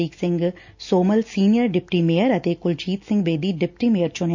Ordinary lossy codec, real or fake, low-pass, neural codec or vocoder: MP3, 48 kbps; real; 7.2 kHz; none